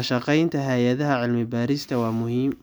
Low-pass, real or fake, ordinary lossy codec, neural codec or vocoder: none; real; none; none